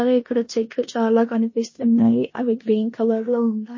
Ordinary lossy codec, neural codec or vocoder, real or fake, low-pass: MP3, 32 kbps; codec, 16 kHz in and 24 kHz out, 0.9 kbps, LongCat-Audio-Codec, four codebook decoder; fake; 7.2 kHz